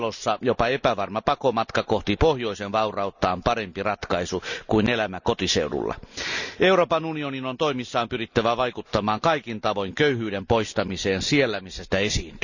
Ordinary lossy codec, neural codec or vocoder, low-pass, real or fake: none; none; 7.2 kHz; real